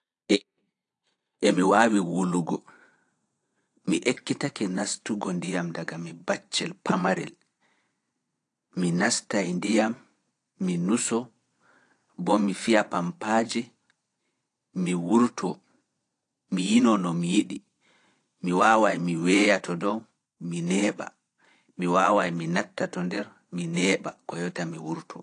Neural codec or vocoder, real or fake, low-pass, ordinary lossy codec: vocoder, 22.05 kHz, 80 mel bands, Vocos; fake; 9.9 kHz; AAC, 48 kbps